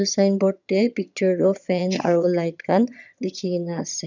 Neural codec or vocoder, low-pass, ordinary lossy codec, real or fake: vocoder, 22.05 kHz, 80 mel bands, HiFi-GAN; 7.2 kHz; none; fake